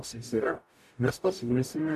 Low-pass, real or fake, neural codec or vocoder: 14.4 kHz; fake; codec, 44.1 kHz, 0.9 kbps, DAC